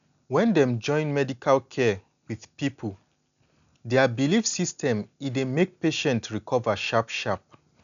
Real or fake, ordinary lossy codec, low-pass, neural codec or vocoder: real; none; 7.2 kHz; none